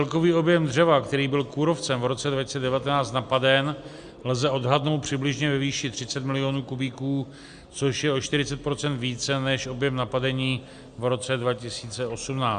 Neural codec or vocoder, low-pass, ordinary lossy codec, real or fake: none; 9.9 kHz; Opus, 64 kbps; real